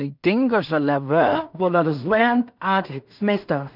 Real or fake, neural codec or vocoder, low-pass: fake; codec, 16 kHz in and 24 kHz out, 0.4 kbps, LongCat-Audio-Codec, two codebook decoder; 5.4 kHz